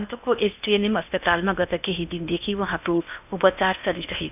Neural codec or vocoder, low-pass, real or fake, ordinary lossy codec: codec, 16 kHz in and 24 kHz out, 0.8 kbps, FocalCodec, streaming, 65536 codes; 3.6 kHz; fake; none